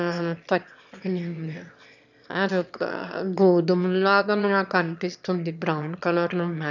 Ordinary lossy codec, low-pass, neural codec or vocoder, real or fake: none; 7.2 kHz; autoencoder, 22.05 kHz, a latent of 192 numbers a frame, VITS, trained on one speaker; fake